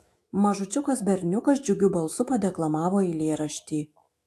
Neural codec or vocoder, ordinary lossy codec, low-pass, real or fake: autoencoder, 48 kHz, 128 numbers a frame, DAC-VAE, trained on Japanese speech; AAC, 64 kbps; 14.4 kHz; fake